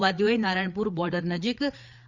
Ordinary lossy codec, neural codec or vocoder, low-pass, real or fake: Opus, 64 kbps; codec, 16 kHz, 4 kbps, FreqCodec, larger model; 7.2 kHz; fake